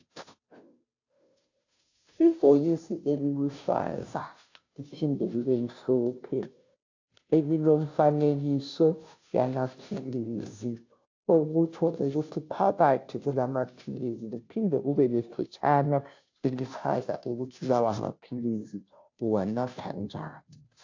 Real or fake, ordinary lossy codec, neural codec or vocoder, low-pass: fake; AAC, 48 kbps; codec, 16 kHz, 0.5 kbps, FunCodec, trained on Chinese and English, 25 frames a second; 7.2 kHz